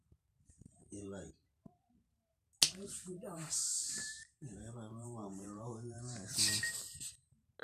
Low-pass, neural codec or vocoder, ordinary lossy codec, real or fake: 14.4 kHz; vocoder, 44.1 kHz, 128 mel bands every 512 samples, BigVGAN v2; none; fake